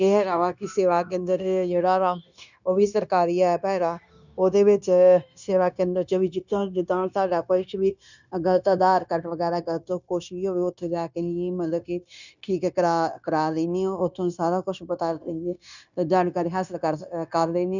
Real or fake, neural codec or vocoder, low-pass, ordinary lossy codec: fake; codec, 16 kHz, 0.9 kbps, LongCat-Audio-Codec; 7.2 kHz; none